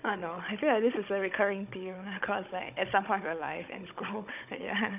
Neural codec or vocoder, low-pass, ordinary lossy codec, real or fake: codec, 16 kHz, 16 kbps, FunCodec, trained on Chinese and English, 50 frames a second; 3.6 kHz; none; fake